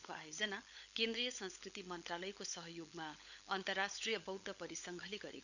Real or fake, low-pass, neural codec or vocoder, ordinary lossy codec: fake; 7.2 kHz; codec, 16 kHz, 16 kbps, FunCodec, trained on LibriTTS, 50 frames a second; none